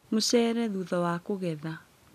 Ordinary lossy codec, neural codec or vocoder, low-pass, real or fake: none; none; 14.4 kHz; real